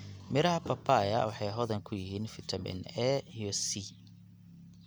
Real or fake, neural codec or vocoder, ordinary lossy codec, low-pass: real; none; none; none